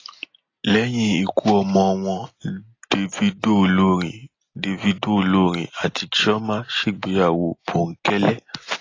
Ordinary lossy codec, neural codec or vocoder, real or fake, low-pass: AAC, 32 kbps; none; real; 7.2 kHz